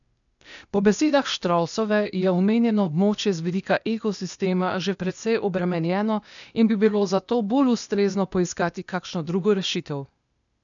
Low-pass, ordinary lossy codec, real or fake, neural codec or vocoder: 7.2 kHz; none; fake; codec, 16 kHz, 0.8 kbps, ZipCodec